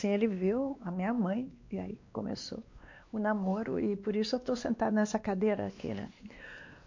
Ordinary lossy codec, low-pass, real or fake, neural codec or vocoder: MP3, 48 kbps; 7.2 kHz; fake; codec, 16 kHz, 2 kbps, X-Codec, WavLM features, trained on Multilingual LibriSpeech